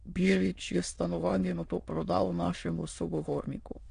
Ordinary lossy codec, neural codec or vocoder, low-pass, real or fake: AAC, 64 kbps; autoencoder, 22.05 kHz, a latent of 192 numbers a frame, VITS, trained on many speakers; 9.9 kHz; fake